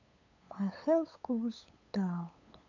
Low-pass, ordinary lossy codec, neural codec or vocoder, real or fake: 7.2 kHz; none; codec, 16 kHz, 8 kbps, FunCodec, trained on LibriTTS, 25 frames a second; fake